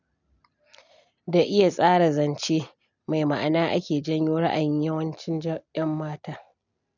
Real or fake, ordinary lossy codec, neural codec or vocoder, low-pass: real; none; none; 7.2 kHz